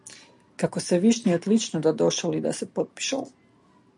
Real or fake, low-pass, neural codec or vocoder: real; 10.8 kHz; none